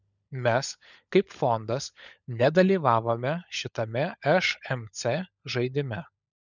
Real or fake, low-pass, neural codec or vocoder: fake; 7.2 kHz; codec, 16 kHz, 16 kbps, FunCodec, trained on LibriTTS, 50 frames a second